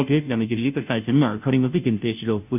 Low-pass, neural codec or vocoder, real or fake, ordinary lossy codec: 3.6 kHz; codec, 16 kHz, 0.5 kbps, FunCodec, trained on Chinese and English, 25 frames a second; fake; none